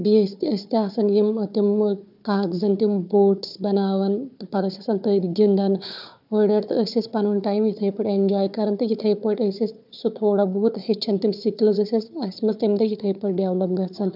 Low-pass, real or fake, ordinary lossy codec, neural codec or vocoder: 5.4 kHz; fake; none; codec, 16 kHz, 4 kbps, FunCodec, trained on Chinese and English, 50 frames a second